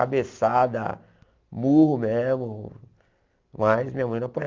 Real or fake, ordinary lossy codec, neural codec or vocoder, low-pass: real; Opus, 24 kbps; none; 7.2 kHz